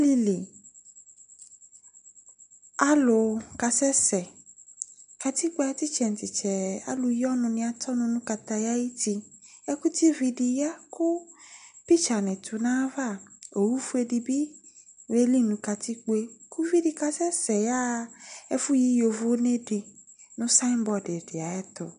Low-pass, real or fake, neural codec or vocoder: 9.9 kHz; real; none